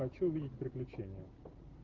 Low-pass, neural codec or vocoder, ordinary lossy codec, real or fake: 7.2 kHz; none; Opus, 16 kbps; real